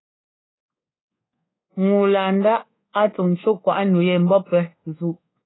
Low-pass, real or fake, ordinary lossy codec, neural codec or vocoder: 7.2 kHz; fake; AAC, 16 kbps; codec, 24 kHz, 1.2 kbps, DualCodec